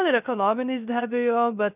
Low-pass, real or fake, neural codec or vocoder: 3.6 kHz; fake; codec, 16 kHz, 0.3 kbps, FocalCodec